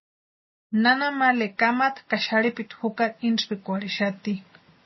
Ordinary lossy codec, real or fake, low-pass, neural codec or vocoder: MP3, 24 kbps; real; 7.2 kHz; none